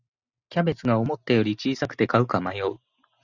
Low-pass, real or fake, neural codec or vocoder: 7.2 kHz; real; none